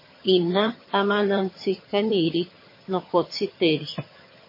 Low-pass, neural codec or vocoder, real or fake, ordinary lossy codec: 5.4 kHz; vocoder, 22.05 kHz, 80 mel bands, HiFi-GAN; fake; MP3, 24 kbps